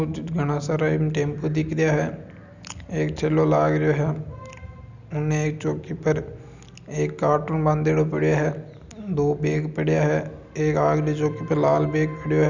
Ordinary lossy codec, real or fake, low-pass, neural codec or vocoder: none; real; 7.2 kHz; none